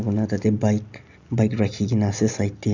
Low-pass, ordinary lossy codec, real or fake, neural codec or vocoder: 7.2 kHz; none; real; none